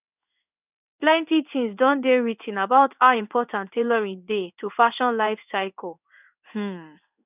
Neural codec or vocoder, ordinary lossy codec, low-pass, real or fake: codec, 16 kHz in and 24 kHz out, 1 kbps, XY-Tokenizer; none; 3.6 kHz; fake